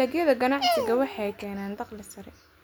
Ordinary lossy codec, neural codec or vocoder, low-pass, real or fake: none; none; none; real